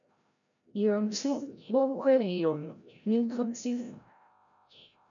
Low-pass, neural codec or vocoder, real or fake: 7.2 kHz; codec, 16 kHz, 0.5 kbps, FreqCodec, larger model; fake